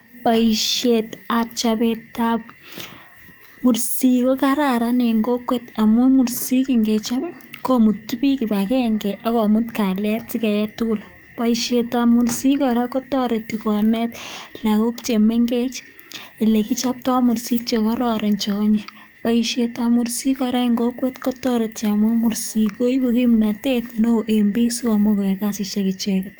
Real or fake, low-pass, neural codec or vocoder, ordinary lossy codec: fake; none; codec, 44.1 kHz, 7.8 kbps, DAC; none